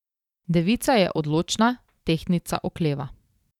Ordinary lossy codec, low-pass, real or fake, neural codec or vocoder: none; 19.8 kHz; fake; vocoder, 44.1 kHz, 128 mel bands every 512 samples, BigVGAN v2